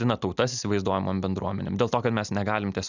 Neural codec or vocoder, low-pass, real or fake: none; 7.2 kHz; real